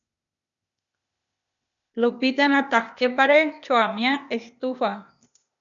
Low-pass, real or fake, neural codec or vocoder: 7.2 kHz; fake; codec, 16 kHz, 0.8 kbps, ZipCodec